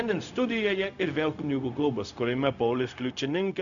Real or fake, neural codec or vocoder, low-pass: fake; codec, 16 kHz, 0.4 kbps, LongCat-Audio-Codec; 7.2 kHz